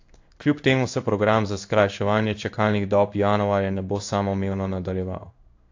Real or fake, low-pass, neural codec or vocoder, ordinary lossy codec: fake; 7.2 kHz; codec, 16 kHz in and 24 kHz out, 1 kbps, XY-Tokenizer; AAC, 48 kbps